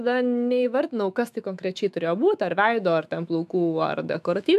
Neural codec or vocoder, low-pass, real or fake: autoencoder, 48 kHz, 128 numbers a frame, DAC-VAE, trained on Japanese speech; 14.4 kHz; fake